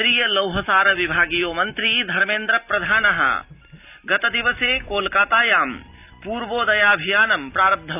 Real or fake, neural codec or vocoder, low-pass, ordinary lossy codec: real; none; 3.6 kHz; none